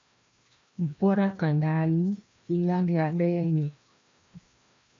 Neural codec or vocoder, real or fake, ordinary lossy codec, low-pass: codec, 16 kHz, 1 kbps, FreqCodec, larger model; fake; MP3, 48 kbps; 7.2 kHz